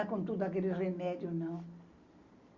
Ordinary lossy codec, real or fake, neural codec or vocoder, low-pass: Opus, 64 kbps; real; none; 7.2 kHz